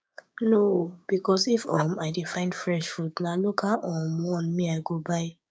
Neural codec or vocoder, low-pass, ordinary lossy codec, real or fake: codec, 16 kHz, 6 kbps, DAC; none; none; fake